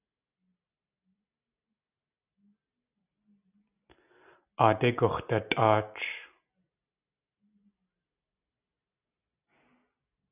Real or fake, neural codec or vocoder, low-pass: real; none; 3.6 kHz